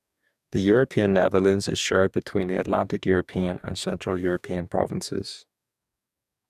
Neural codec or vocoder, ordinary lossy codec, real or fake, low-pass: codec, 44.1 kHz, 2.6 kbps, DAC; none; fake; 14.4 kHz